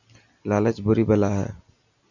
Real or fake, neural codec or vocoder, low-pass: real; none; 7.2 kHz